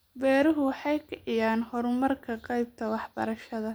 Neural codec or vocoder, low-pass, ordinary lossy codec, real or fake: none; none; none; real